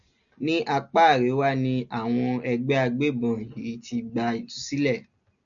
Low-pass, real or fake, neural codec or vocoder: 7.2 kHz; real; none